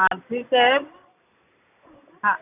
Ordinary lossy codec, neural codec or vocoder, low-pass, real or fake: none; none; 3.6 kHz; real